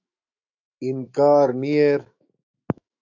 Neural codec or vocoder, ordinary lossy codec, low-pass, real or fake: autoencoder, 48 kHz, 128 numbers a frame, DAC-VAE, trained on Japanese speech; AAC, 48 kbps; 7.2 kHz; fake